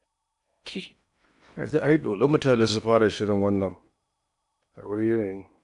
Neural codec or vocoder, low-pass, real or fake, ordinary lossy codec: codec, 16 kHz in and 24 kHz out, 0.8 kbps, FocalCodec, streaming, 65536 codes; 10.8 kHz; fake; none